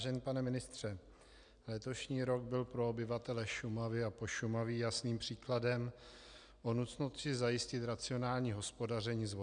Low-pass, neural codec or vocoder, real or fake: 9.9 kHz; none; real